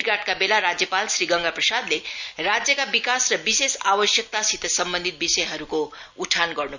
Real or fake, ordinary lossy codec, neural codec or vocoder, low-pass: real; none; none; 7.2 kHz